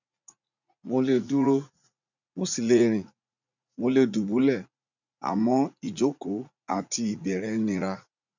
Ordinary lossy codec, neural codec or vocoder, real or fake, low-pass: none; vocoder, 44.1 kHz, 80 mel bands, Vocos; fake; 7.2 kHz